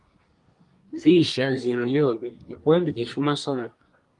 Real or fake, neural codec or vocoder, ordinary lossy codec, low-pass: fake; codec, 24 kHz, 1 kbps, SNAC; Opus, 32 kbps; 10.8 kHz